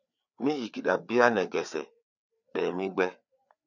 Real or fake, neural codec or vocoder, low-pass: fake; codec, 16 kHz, 4 kbps, FreqCodec, larger model; 7.2 kHz